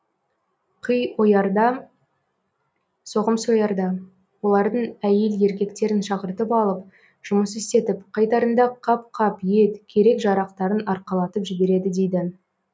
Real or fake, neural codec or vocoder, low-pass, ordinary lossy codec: real; none; none; none